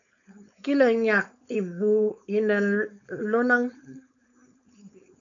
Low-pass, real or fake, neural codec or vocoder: 7.2 kHz; fake; codec, 16 kHz, 4.8 kbps, FACodec